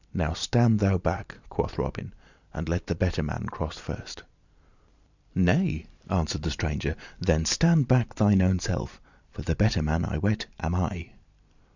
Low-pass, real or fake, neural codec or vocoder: 7.2 kHz; fake; vocoder, 44.1 kHz, 128 mel bands every 512 samples, BigVGAN v2